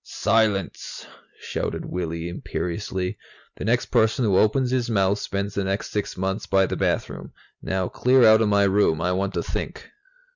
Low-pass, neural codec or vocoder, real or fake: 7.2 kHz; none; real